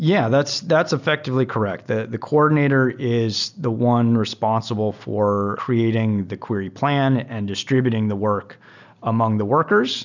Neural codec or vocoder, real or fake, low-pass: none; real; 7.2 kHz